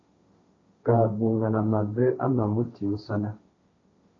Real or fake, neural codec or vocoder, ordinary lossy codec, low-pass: fake; codec, 16 kHz, 1.1 kbps, Voila-Tokenizer; AAC, 32 kbps; 7.2 kHz